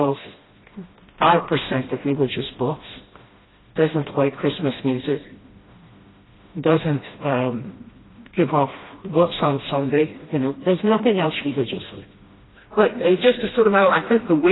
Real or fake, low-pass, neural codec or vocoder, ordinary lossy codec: fake; 7.2 kHz; codec, 16 kHz, 1 kbps, FreqCodec, smaller model; AAC, 16 kbps